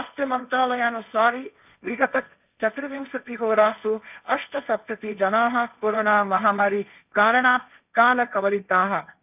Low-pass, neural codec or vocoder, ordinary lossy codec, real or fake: 3.6 kHz; codec, 16 kHz, 1.1 kbps, Voila-Tokenizer; none; fake